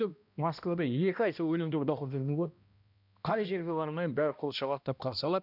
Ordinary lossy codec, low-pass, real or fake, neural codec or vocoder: none; 5.4 kHz; fake; codec, 16 kHz, 1 kbps, X-Codec, HuBERT features, trained on balanced general audio